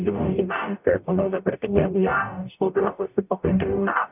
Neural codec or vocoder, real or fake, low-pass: codec, 44.1 kHz, 0.9 kbps, DAC; fake; 3.6 kHz